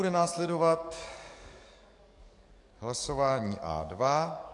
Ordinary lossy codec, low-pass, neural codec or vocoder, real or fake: AAC, 64 kbps; 10.8 kHz; codec, 44.1 kHz, 7.8 kbps, DAC; fake